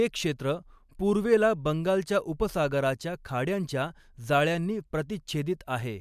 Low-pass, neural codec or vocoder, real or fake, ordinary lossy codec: 14.4 kHz; none; real; MP3, 96 kbps